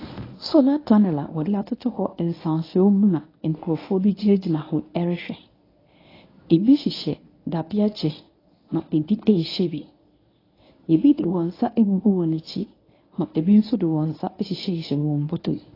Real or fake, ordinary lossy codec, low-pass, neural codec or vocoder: fake; AAC, 24 kbps; 5.4 kHz; codec, 24 kHz, 0.9 kbps, WavTokenizer, medium speech release version 1